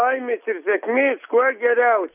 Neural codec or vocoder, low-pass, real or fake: none; 3.6 kHz; real